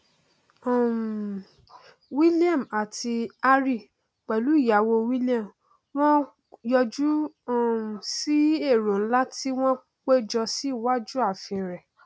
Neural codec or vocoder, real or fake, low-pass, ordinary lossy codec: none; real; none; none